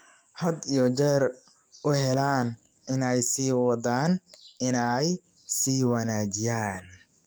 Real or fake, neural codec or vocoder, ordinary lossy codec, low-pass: fake; codec, 44.1 kHz, 7.8 kbps, DAC; none; none